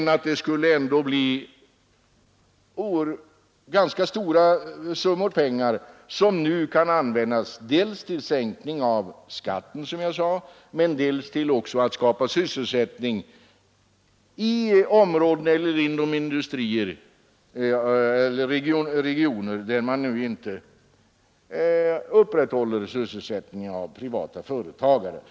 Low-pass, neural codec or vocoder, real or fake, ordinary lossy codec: none; none; real; none